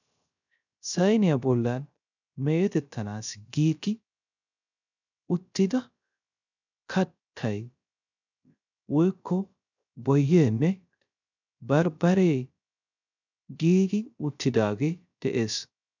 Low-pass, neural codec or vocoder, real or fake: 7.2 kHz; codec, 16 kHz, 0.3 kbps, FocalCodec; fake